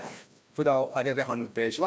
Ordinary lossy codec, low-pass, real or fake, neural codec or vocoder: none; none; fake; codec, 16 kHz, 1 kbps, FreqCodec, larger model